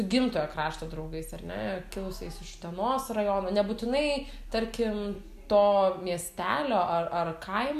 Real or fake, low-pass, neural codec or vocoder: real; 14.4 kHz; none